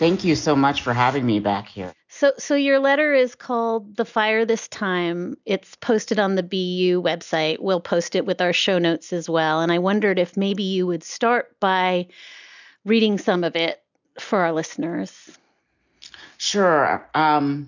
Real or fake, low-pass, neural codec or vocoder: real; 7.2 kHz; none